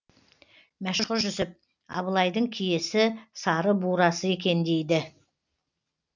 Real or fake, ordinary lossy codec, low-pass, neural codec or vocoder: real; none; 7.2 kHz; none